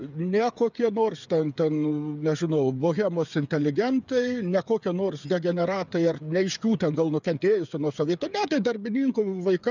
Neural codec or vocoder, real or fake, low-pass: codec, 16 kHz, 8 kbps, FreqCodec, smaller model; fake; 7.2 kHz